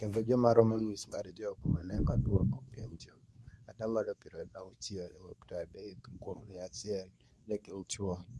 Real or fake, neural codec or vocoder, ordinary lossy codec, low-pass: fake; codec, 24 kHz, 0.9 kbps, WavTokenizer, medium speech release version 2; none; none